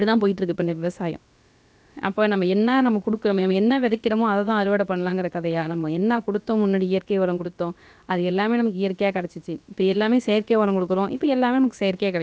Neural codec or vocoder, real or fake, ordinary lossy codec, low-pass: codec, 16 kHz, about 1 kbps, DyCAST, with the encoder's durations; fake; none; none